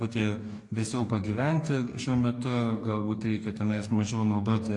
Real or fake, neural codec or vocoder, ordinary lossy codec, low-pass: fake; codec, 44.1 kHz, 2.6 kbps, DAC; AAC, 48 kbps; 10.8 kHz